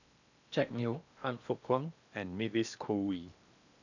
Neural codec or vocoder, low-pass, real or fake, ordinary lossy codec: codec, 16 kHz in and 24 kHz out, 0.8 kbps, FocalCodec, streaming, 65536 codes; 7.2 kHz; fake; none